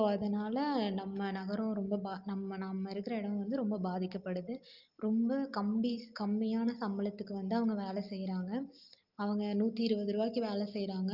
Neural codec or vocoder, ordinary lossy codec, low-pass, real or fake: none; Opus, 32 kbps; 5.4 kHz; real